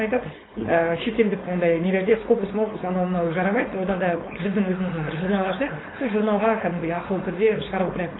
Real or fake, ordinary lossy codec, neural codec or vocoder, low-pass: fake; AAC, 16 kbps; codec, 16 kHz, 4.8 kbps, FACodec; 7.2 kHz